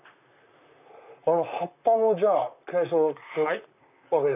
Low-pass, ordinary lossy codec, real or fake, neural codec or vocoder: 3.6 kHz; none; fake; vocoder, 44.1 kHz, 128 mel bands, Pupu-Vocoder